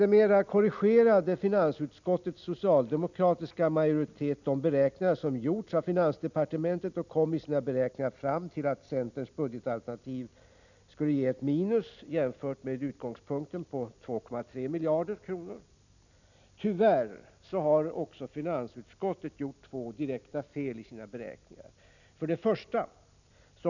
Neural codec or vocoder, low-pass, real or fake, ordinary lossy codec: none; 7.2 kHz; real; none